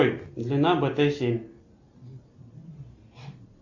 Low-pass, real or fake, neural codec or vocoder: 7.2 kHz; real; none